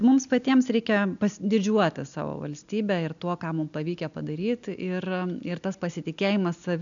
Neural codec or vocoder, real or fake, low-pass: none; real; 7.2 kHz